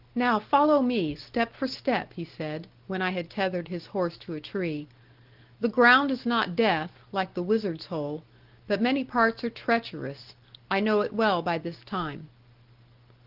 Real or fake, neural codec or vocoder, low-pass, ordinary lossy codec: real; none; 5.4 kHz; Opus, 16 kbps